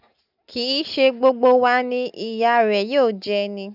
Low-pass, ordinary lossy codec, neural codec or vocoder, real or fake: 5.4 kHz; none; none; real